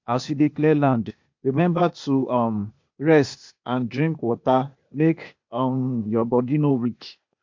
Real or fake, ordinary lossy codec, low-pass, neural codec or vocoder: fake; MP3, 48 kbps; 7.2 kHz; codec, 16 kHz, 0.8 kbps, ZipCodec